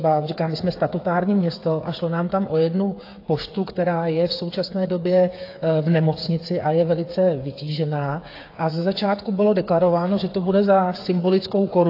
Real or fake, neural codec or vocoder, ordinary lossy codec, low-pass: fake; codec, 16 kHz, 8 kbps, FreqCodec, smaller model; AAC, 32 kbps; 5.4 kHz